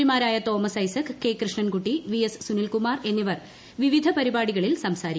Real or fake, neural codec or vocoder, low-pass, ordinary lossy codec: real; none; none; none